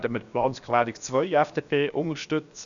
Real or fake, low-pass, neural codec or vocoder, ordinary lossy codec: fake; 7.2 kHz; codec, 16 kHz, 0.7 kbps, FocalCodec; none